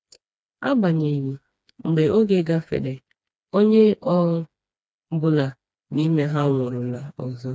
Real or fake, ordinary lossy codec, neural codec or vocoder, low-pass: fake; none; codec, 16 kHz, 2 kbps, FreqCodec, smaller model; none